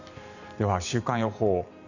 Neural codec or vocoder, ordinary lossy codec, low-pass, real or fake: vocoder, 44.1 kHz, 128 mel bands every 512 samples, BigVGAN v2; none; 7.2 kHz; fake